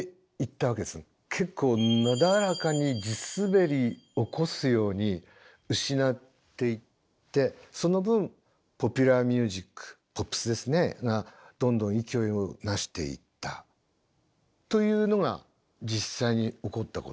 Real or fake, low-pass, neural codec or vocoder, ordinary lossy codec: real; none; none; none